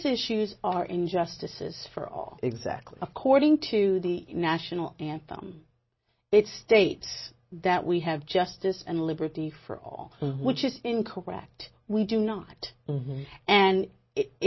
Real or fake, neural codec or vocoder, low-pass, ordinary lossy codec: real; none; 7.2 kHz; MP3, 24 kbps